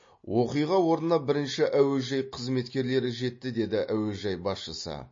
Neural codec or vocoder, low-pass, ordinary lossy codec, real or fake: none; 7.2 kHz; MP3, 32 kbps; real